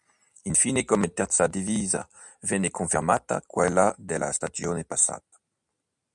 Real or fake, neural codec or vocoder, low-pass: real; none; 10.8 kHz